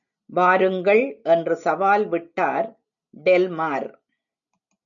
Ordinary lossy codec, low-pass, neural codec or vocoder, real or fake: MP3, 96 kbps; 7.2 kHz; none; real